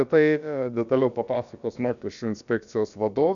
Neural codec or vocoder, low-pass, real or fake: codec, 16 kHz, about 1 kbps, DyCAST, with the encoder's durations; 7.2 kHz; fake